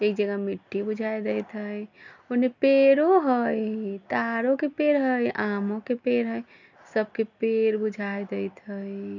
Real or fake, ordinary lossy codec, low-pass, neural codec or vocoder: real; none; 7.2 kHz; none